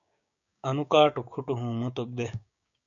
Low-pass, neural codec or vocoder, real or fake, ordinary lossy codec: 7.2 kHz; codec, 16 kHz, 6 kbps, DAC; fake; MP3, 96 kbps